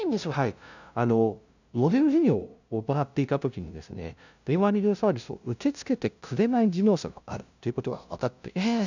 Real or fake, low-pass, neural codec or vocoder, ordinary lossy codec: fake; 7.2 kHz; codec, 16 kHz, 0.5 kbps, FunCodec, trained on LibriTTS, 25 frames a second; none